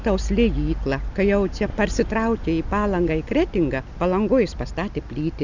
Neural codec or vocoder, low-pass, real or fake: none; 7.2 kHz; real